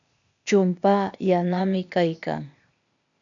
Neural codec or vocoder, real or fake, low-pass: codec, 16 kHz, 0.8 kbps, ZipCodec; fake; 7.2 kHz